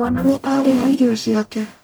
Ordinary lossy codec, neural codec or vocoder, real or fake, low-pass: none; codec, 44.1 kHz, 0.9 kbps, DAC; fake; none